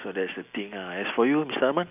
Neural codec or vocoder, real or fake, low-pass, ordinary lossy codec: none; real; 3.6 kHz; none